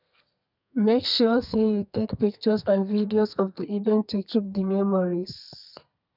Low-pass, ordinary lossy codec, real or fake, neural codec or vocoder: 5.4 kHz; none; fake; codec, 32 kHz, 1.9 kbps, SNAC